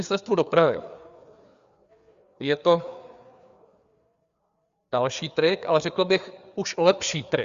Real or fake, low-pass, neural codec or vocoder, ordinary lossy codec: fake; 7.2 kHz; codec, 16 kHz, 4 kbps, FreqCodec, larger model; Opus, 64 kbps